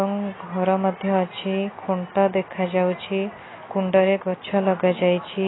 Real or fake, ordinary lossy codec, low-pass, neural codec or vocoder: real; AAC, 16 kbps; 7.2 kHz; none